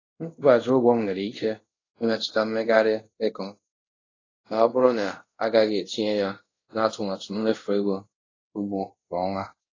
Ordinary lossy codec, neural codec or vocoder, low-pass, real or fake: AAC, 32 kbps; codec, 24 kHz, 0.5 kbps, DualCodec; 7.2 kHz; fake